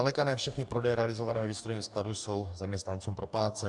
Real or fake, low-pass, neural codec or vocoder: fake; 10.8 kHz; codec, 44.1 kHz, 2.6 kbps, DAC